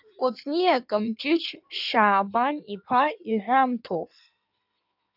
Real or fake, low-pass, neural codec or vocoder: fake; 5.4 kHz; codec, 16 kHz in and 24 kHz out, 1.1 kbps, FireRedTTS-2 codec